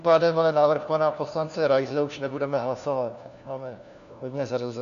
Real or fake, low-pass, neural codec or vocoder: fake; 7.2 kHz; codec, 16 kHz, 1 kbps, FunCodec, trained on LibriTTS, 50 frames a second